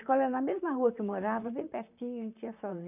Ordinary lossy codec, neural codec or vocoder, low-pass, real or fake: none; vocoder, 44.1 kHz, 128 mel bands, Pupu-Vocoder; 3.6 kHz; fake